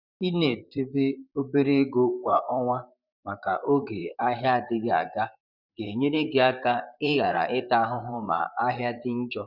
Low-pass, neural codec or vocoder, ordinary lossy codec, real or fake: 5.4 kHz; vocoder, 44.1 kHz, 128 mel bands, Pupu-Vocoder; Opus, 64 kbps; fake